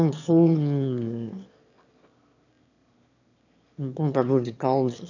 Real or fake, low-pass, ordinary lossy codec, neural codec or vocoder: fake; 7.2 kHz; none; autoencoder, 22.05 kHz, a latent of 192 numbers a frame, VITS, trained on one speaker